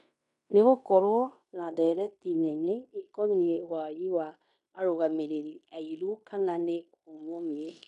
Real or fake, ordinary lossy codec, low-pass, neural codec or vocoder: fake; none; 10.8 kHz; codec, 16 kHz in and 24 kHz out, 0.9 kbps, LongCat-Audio-Codec, fine tuned four codebook decoder